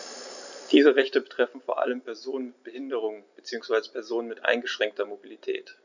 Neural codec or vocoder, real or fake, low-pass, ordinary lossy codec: none; real; 7.2 kHz; none